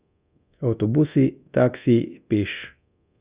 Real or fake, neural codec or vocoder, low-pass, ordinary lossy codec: fake; codec, 24 kHz, 0.9 kbps, DualCodec; 3.6 kHz; Opus, 64 kbps